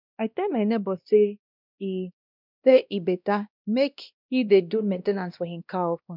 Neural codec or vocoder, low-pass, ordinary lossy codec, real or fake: codec, 16 kHz, 1 kbps, X-Codec, WavLM features, trained on Multilingual LibriSpeech; 5.4 kHz; none; fake